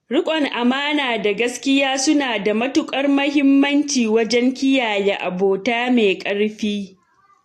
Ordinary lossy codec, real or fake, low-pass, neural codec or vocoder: AAC, 48 kbps; real; 14.4 kHz; none